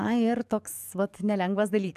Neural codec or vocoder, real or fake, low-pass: codec, 44.1 kHz, 7.8 kbps, DAC; fake; 14.4 kHz